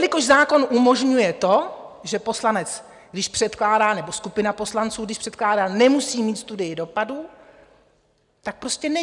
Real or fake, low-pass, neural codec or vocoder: real; 10.8 kHz; none